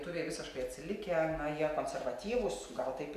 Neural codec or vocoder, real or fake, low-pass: none; real; 14.4 kHz